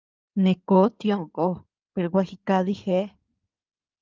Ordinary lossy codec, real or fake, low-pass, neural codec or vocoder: Opus, 32 kbps; fake; 7.2 kHz; codec, 16 kHz in and 24 kHz out, 2.2 kbps, FireRedTTS-2 codec